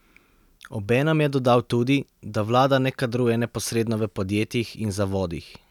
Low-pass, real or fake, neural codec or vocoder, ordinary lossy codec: 19.8 kHz; real; none; none